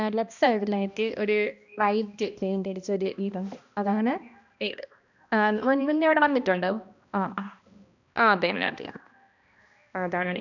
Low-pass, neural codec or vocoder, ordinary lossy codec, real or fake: 7.2 kHz; codec, 16 kHz, 1 kbps, X-Codec, HuBERT features, trained on balanced general audio; none; fake